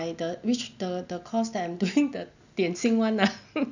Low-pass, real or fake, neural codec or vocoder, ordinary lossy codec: 7.2 kHz; real; none; none